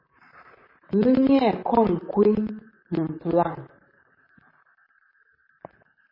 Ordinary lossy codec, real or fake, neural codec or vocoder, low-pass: MP3, 24 kbps; fake; vocoder, 44.1 kHz, 128 mel bands, Pupu-Vocoder; 5.4 kHz